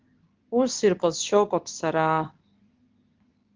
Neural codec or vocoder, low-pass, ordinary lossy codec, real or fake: codec, 24 kHz, 0.9 kbps, WavTokenizer, medium speech release version 2; 7.2 kHz; Opus, 32 kbps; fake